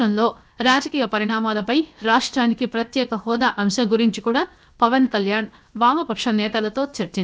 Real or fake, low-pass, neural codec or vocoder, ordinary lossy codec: fake; none; codec, 16 kHz, 0.7 kbps, FocalCodec; none